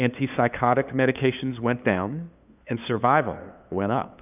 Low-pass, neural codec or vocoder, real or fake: 3.6 kHz; codec, 16 kHz, 2 kbps, FunCodec, trained on LibriTTS, 25 frames a second; fake